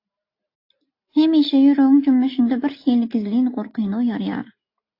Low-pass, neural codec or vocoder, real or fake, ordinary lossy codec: 5.4 kHz; none; real; AAC, 32 kbps